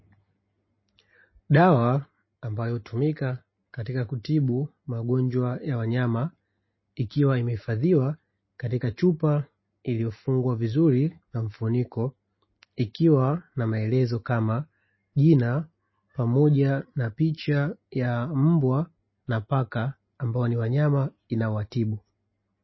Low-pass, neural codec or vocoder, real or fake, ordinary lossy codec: 7.2 kHz; none; real; MP3, 24 kbps